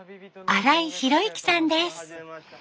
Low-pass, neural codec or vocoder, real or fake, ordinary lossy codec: none; none; real; none